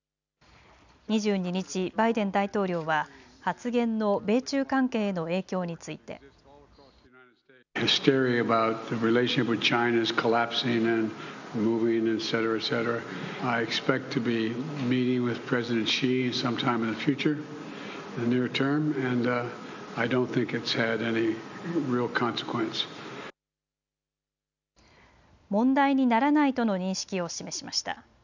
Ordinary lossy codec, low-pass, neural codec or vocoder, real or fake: none; 7.2 kHz; none; real